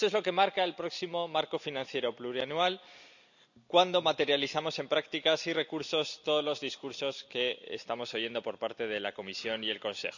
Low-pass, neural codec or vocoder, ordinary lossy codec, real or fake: 7.2 kHz; none; none; real